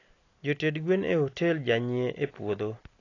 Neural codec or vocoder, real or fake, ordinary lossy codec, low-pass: none; real; AAC, 32 kbps; 7.2 kHz